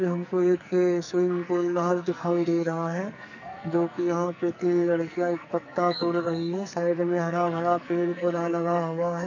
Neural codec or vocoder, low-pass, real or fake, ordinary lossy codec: codec, 44.1 kHz, 2.6 kbps, SNAC; 7.2 kHz; fake; none